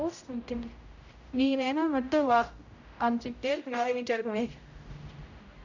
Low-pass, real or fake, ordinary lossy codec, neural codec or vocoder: 7.2 kHz; fake; none; codec, 16 kHz, 0.5 kbps, X-Codec, HuBERT features, trained on general audio